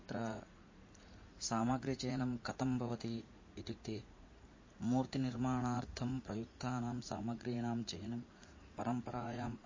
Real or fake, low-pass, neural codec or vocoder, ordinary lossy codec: fake; 7.2 kHz; vocoder, 44.1 kHz, 80 mel bands, Vocos; MP3, 32 kbps